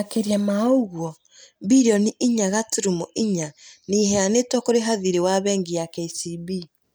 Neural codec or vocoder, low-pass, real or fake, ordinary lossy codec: none; none; real; none